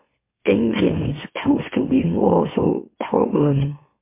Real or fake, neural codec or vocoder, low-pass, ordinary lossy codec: fake; autoencoder, 44.1 kHz, a latent of 192 numbers a frame, MeloTTS; 3.6 kHz; MP3, 24 kbps